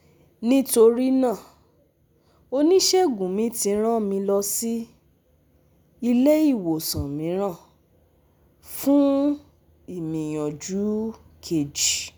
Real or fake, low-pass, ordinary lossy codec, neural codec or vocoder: real; none; none; none